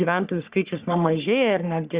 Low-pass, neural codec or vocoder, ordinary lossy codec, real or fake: 3.6 kHz; codec, 44.1 kHz, 3.4 kbps, Pupu-Codec; Opus, 64 kbps; fake